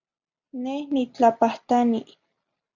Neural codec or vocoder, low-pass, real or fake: none; 7.2 kHz; real